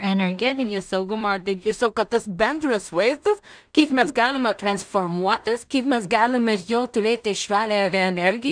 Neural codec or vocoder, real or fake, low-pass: codec, 16 kHz in and 24 kHz out, 0.4 kbps, LongCat-Audio-Codec, two codebook decoder; fake; 9.9 kHz